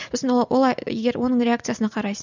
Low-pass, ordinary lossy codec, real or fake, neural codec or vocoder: 7.2 kHz; none; real; none